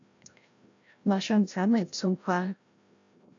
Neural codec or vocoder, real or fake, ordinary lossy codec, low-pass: codec, 16 kHz, 0.5 kbps, FreqCodec, larger model; fake; AAC, 48 kbps; 7.2 kHz